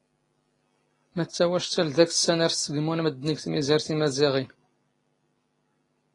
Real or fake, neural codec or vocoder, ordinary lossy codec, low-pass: real; none; AAC, 32 kbps; 10.8 kHz